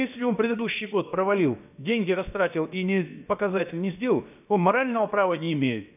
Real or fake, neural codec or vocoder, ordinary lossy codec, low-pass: fake; codec, 16 kHz, about 1 kbps, DyCAST, with the encoder's durations; none; 3.6 kHz